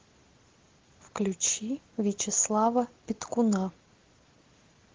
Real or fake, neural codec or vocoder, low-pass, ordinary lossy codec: real; none; 7.2 kHz; Opus, 16 kbps